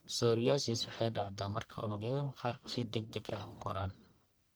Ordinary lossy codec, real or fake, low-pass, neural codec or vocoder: none; fake; none; codec, 44.1 kHz, 1.7 kbps, Pupu-Codec